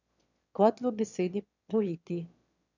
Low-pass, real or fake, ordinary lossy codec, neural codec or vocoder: 7.2 kHz; fake; none; autoencoder, 22.05 kHz, a latent of 192 numbers a frame, VITS, trained on one speaker